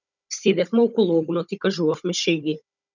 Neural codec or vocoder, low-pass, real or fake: codec, 16 kHz, 16 kbps, FunCodec, trained on Chinese and English, 50 frames a second; 7.2 kHz; fake